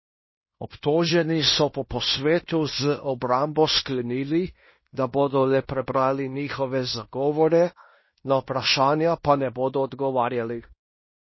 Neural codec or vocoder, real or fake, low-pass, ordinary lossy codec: codec, 16 kHz in and 24 kHz out, 0.9 kbps, LongCat-Audio-Codec, fine tuned four codebook decoder; fake; 7.2 kHz; MP3, 24 kbps